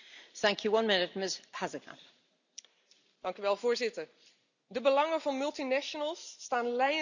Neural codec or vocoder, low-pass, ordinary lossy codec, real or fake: none; 7.2 kHz; none; real